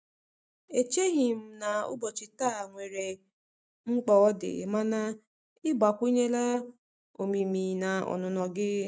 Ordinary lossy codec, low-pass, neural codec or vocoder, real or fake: none; none; none; real